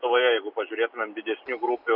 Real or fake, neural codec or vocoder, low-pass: real; none; 5.4 kHz